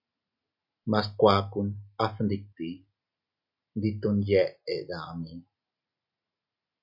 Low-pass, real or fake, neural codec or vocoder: 5.4 kHz; real; none